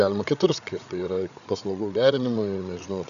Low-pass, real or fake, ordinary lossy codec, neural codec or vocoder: 7.2 kHz; fake; MP3, 96 kbps; codec, 16 kHz, 8 kbps, FreqCodec, larger model